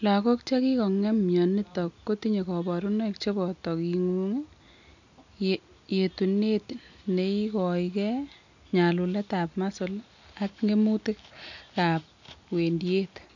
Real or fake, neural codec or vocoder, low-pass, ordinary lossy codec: real; none; 7.2 kHz; none